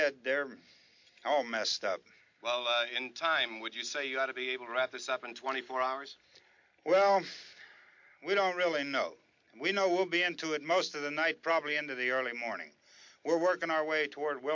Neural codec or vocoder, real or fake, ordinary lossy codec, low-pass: none; real; MP3, 48 kbps; 7.2 kHz